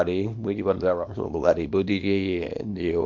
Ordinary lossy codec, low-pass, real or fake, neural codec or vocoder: MP3, 64 kbps; 7.2 kHz; fake; codec, 24 kHz, 0.9 kbps, WavTokenizer, small release